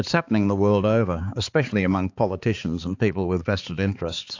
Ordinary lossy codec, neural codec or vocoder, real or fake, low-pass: AAC, 48 kbps; codec, 16 kHz, 4 kbps, X-Codec, HuBERT features, trained on balanced general audio; fake; 7.2 kHz